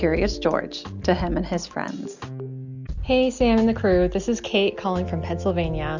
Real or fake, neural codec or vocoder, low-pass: real; none; 7.2 kHz